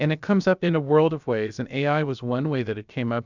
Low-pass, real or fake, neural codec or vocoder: 7.2 kHz; fake; codec, 16 kHz, about 1 kbps, DyCAST, with the encoder's durations